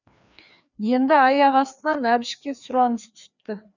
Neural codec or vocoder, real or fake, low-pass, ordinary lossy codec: codec, 16 kHz, 2 kbps, FreqCodec, larger model; fake; 7.2 kHz; none